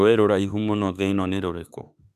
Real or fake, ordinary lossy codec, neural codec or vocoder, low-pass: fake; none; autoencoder, 48 kHz, 32 numbers a frame, DAC-VAE, trained on Japanese speech; 14.4 kHz